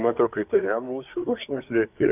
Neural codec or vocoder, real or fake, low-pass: codec, 24 kHz, 1 kbps, SNAC; fake; 3.6 kHz